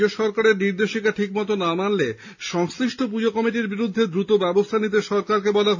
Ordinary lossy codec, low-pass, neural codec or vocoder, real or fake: MP3, 64 kbps; 7.2 kHz; none; real